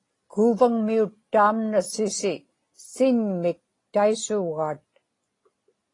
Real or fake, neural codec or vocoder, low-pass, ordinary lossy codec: real; none; 10.8 kHz; AAC, 32 kbps